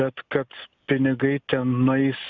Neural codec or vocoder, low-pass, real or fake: none; 7.2 kHz; real